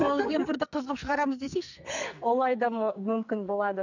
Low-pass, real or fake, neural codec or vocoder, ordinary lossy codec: 7.2 kHz; fake; codec, 44.1 kHz, 2.6 kbps, SNAC; none